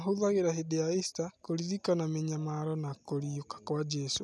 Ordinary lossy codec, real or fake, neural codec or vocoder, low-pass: none; real; none; none